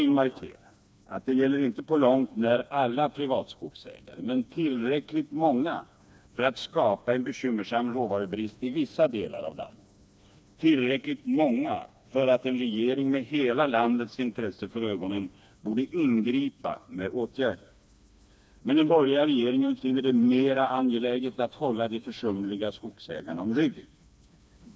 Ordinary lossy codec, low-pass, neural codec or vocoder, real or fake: none; none; codec, 16 kHz, 2 kbps, FreqCodec, smaller model; fake